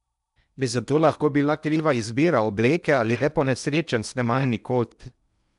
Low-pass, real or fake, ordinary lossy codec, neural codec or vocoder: 10.8 kHz; fake; none; codec, 16 kHz in and 24 kHz out, 0.8 kbps, FocalCodec, streaming, 65536 codes